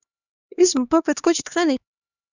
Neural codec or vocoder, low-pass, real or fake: codec, 16 kHz, 2 kbps, X-Codec, HuBERT features, trained on LibriSpeech; 7.2 kHz; fake